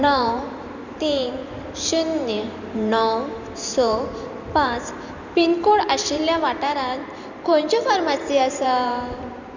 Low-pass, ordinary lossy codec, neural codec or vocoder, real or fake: 7.2 kHz; Opus, 64 kbps; vocoder, 44.1 kHz, 128 mel bands every 512 samples, BigVGAN v2; fake